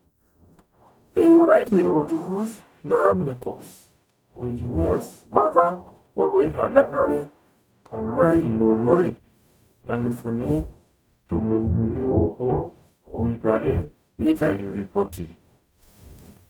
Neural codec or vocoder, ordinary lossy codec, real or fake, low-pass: codec, 44.1 kHz, 0.9 kbps, DAC; none; fake; 19.8 kHz